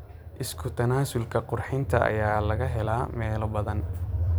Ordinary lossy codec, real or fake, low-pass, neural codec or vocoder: none; fake; none; vocoder, 44.1 kHz, 128 mel bands every 256 samples, BigVGAN v2